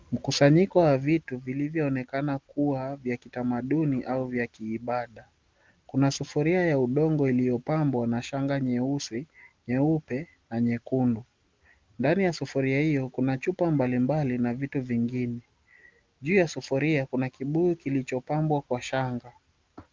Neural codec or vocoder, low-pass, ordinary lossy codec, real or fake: none; 7.2 kHz; Opus, 32 kbps; real